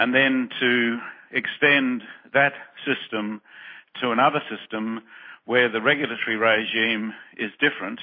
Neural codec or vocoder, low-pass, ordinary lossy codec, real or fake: none; 5.4 kHz; MP3, 24 kbps; real